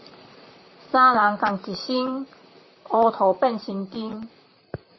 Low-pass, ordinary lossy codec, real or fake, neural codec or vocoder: 7.2 kHz; MP3, 24 kbps; fake; vocoder, 44.1 kHz, 128 mel bands, Pupu-Vocoder